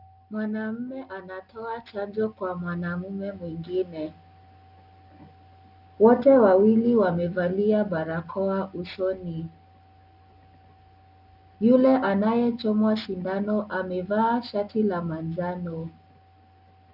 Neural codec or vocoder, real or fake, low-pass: none; real; 5.4 kHz